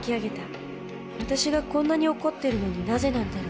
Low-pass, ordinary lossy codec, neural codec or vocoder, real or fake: none; none; none; real